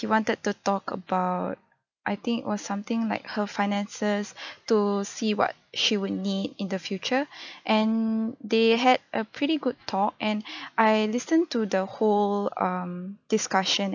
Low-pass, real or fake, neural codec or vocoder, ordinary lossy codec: 7.2 kHz; real; none; none